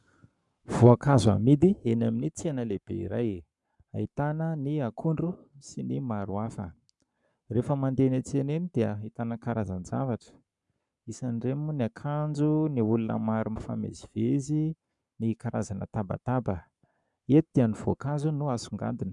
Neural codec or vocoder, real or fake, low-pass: codec, 44.1 kHz, 7.8 kbps, Pupu-Codec; fake; 10.8 kHz